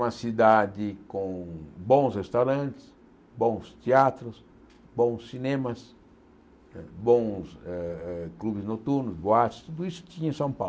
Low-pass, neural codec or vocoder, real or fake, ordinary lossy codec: none; none; real; none